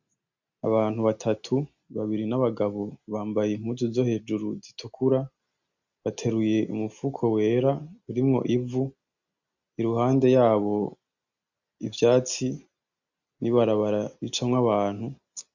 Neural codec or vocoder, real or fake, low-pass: none; real; 7.2 kHz